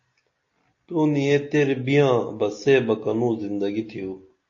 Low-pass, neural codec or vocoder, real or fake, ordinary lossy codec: 7.2 kHz; none; real; AAC, 48 kbps